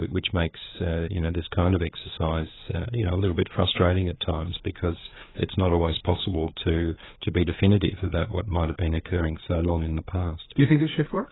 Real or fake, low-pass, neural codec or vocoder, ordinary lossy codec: fake; 7.2 kHz; codec, 16 kHz, 4 kbps, FreqCodec, larger model; AAC, 16 kbps